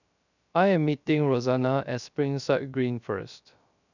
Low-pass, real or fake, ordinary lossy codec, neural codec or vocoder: 7.2 kHz; fake; none; codec, 16 kHz, 0.3 kbps, FocalCodec